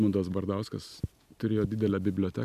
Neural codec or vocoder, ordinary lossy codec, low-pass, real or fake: none; MP3, 96 kbps; 14.4 kHz; real